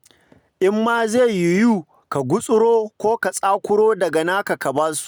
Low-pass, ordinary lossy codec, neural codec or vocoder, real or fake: none; none; none; real